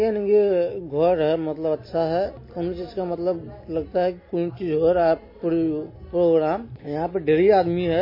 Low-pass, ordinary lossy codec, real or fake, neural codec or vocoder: 5.4 kHz; MP3, 24 kbps; real; none